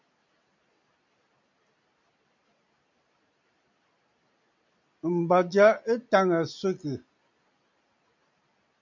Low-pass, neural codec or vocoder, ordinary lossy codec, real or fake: 7.2 kHz; none; MP3, 48 kbps; real